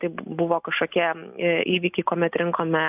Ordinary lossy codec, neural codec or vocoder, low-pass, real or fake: AAC, 32 kbps; none; 3.6 kHz; real